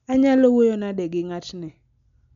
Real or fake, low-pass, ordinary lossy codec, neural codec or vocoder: real; 7.2 kHz; none; none